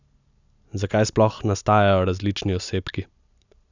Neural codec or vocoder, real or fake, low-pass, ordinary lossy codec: none; real; 7.2 kHz; none